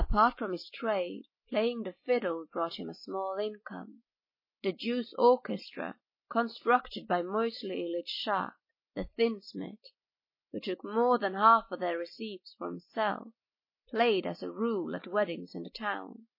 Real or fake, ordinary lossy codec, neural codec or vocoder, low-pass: real; MP3, 24 kbps; none; 5.4 kHz